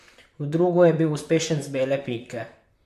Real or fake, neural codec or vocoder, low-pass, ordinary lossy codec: fake; vocoder, 44.1 kHz, 128 mel bands, Pupu-Vocoder; 14.4 kHz; MP3, 64 kbps